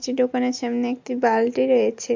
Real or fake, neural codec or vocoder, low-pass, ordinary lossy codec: real; none; 7.2 kHz; MP3, 48 kbps